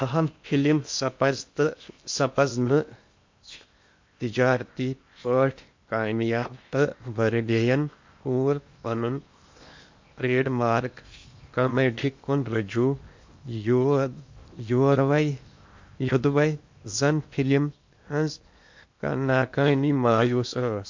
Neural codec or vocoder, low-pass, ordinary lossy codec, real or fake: codec, 16 kHz in and 24 kHz out, 0.8 kbps, FocalCodec, streaming, 65536 codes; 7.2 kHz; MP3, 48 kbps; fake